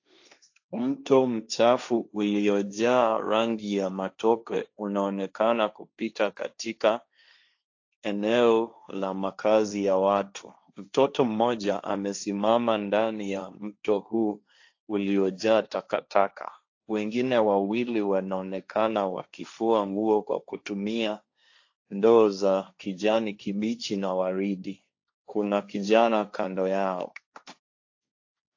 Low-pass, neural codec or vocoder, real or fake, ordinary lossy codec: 7.2 kHz; codec, 16 kHz, 1.1 kbps, Voila-Tokenizer; fake; AAC, 48 kbps